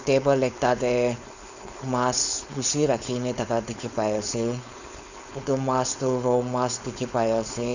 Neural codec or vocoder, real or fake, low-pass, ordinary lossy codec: codec, 16 kHz, 4.8 kbps, FACodec; fake; 7.2 kHz; none